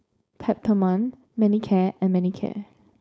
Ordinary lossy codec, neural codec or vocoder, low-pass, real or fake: none; codec, 16 kHz, 4.8 kbps, FACodec; none; fake